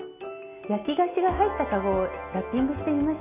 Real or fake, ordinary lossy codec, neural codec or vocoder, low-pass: real; none; none; 3.6 kHz